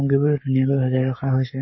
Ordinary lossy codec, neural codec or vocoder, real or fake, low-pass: MP3, 24 kbps; codec, 44.1 kHz, 7.8 kbps, Pupu-Codec; fake; 7.2 kHz